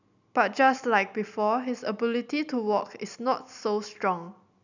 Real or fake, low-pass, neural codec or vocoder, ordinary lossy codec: real; 7.2 kHz; none; none